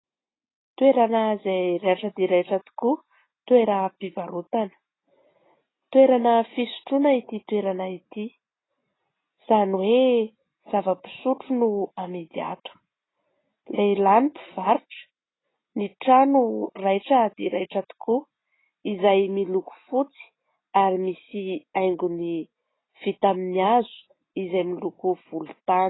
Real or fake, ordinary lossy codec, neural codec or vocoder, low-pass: real; AAC, 16 kbps; none; 7.2 kHz